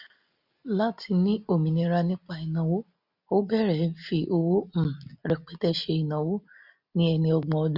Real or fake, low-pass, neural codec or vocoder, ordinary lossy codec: real; 5.4 kHz; none; none